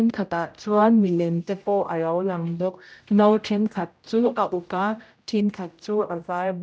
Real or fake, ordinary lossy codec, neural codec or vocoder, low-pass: fake; none; codec, 16 kHz, 0.5 kbps, X-Codec, HuBERT features, trained on general audio; none